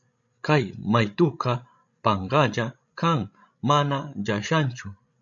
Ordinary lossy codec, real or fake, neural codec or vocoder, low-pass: AAC, 64 kbps; fake; codec, 16 kHz, 16 kbps, FreqCodec, larger model; 7.2 kHz